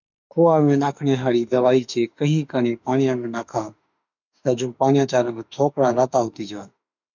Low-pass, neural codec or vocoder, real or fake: 7.2 kHz; autoencoder, 48 kHz, 32 numbers a frame, DAC-VAE, trained on Japanese speech; fake